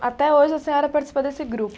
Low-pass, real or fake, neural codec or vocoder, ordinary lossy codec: none; real; none; none